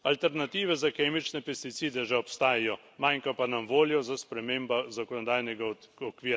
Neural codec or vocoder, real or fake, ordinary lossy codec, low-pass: none; real; none; none